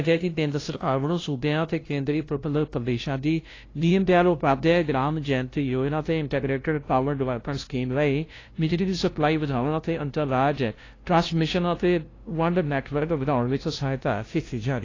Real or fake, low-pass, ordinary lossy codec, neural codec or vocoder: fake; 7.2 kHz; AAC, 32 kbps; codec, 16 kHz, 0.5 kbps, FunCodec, trained on LibriTTS, 25 frames a second